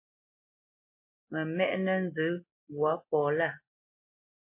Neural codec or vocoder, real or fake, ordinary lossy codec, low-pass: none; real; MP3, 24 kbps; 3.6 kHz